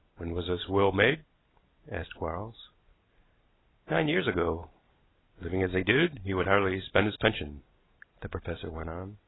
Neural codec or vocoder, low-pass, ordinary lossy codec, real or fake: none; 7.2 kHz; AAC, 16 kbps; real